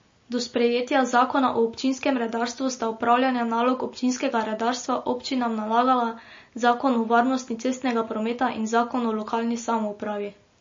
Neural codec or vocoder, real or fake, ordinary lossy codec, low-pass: none; real; MP3, 32 kbps; 7.2 kHz